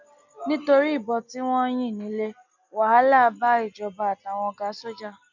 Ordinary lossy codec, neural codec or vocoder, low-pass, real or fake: none; none; 7.2 kHz; real